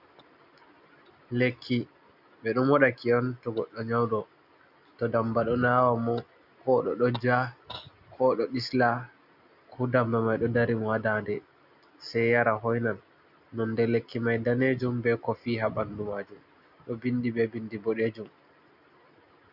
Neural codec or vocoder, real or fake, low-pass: none; real; 5.4 kHz